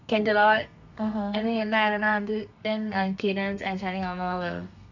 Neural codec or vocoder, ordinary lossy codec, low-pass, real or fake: codec, 32 kHz, 1.9 kbps, SNAC; none; 7.2 kHz; fake